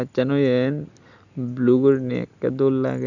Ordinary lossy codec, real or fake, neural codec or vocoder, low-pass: none; real; none; 7.2 kHz